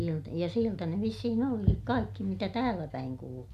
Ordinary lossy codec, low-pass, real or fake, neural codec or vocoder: none; 14.4 kHz; real; none